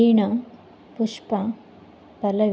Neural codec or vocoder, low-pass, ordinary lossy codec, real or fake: none; none; none; real